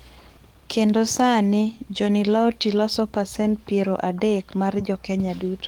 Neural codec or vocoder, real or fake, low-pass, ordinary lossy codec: codec, 44.1 kHz, 7.8 kbps, DAC; fake; 19.8 kHz; Opus, 24 kbps